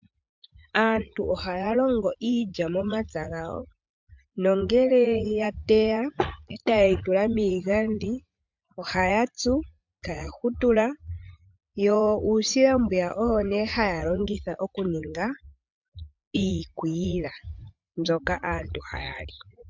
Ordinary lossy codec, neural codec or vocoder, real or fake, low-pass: MP3, 64 kbps; vocoder, 44.1 kHz, 80 mel bands, Vocos; fake; 7.2 kHz